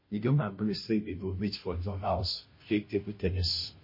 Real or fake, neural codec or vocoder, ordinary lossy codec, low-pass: fake; codec, 16 kHz, 0.5 kbps, FunCodec, trained on Chinese and English, 25 frames a second; MP3, 24 kbps; 5.4 kHz